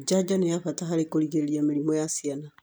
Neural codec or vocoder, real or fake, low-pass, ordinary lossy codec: none; real; none; none